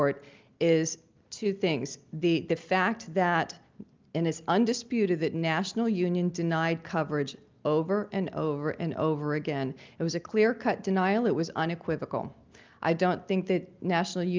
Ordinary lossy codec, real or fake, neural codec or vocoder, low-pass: Opus, 24 kbps; real; none; 7.2 kHz